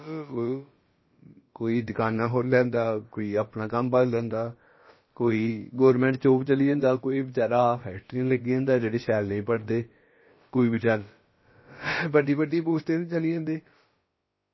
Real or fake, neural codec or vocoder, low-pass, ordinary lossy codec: fake; codec, 16 kHz, about 1 kbps, DyCAST, with the encoder's durations; 7.2 kHz; MP3, 24 kbps